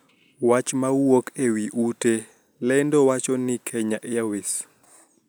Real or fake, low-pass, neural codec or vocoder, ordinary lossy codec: real; none; none; none